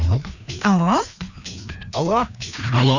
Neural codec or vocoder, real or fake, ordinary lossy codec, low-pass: codec, 16 kHz, 2 kbps, FreqCodec, larger model; fake; none; 7.2 kHz